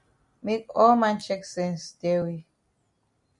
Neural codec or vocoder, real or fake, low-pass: none; real; 10.8 kHz